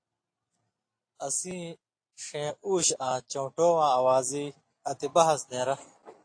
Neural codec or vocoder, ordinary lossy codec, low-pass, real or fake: none; AAC, 48 kbps; 9.9 kHz; real